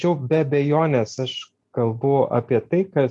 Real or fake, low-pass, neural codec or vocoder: real; 10.8 kHz; none